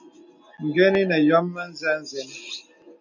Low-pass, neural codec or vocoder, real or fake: 7.2 kHz; none; real